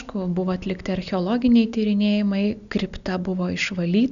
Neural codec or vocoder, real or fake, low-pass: none; real; 7.2 kHz